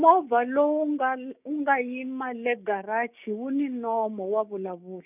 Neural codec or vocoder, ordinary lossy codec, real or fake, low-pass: codec, 16 kHz, 6 kbps, DAC; none; fake; 3.6 kHz